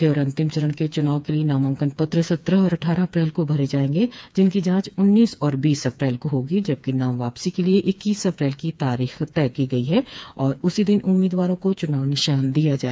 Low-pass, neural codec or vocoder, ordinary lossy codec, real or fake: none; codec, 16 kHz, 4 kbps, FreqCodec, smaller model; none; fake